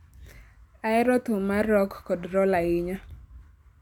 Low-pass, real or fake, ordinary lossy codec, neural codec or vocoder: 19.8 kHz; real; none; none